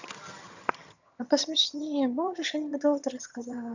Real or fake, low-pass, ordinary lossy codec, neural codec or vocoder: fake; 7.2 kHz; none; vocoder, 22.05 kHz, 80 mel bands, HiFi-GAN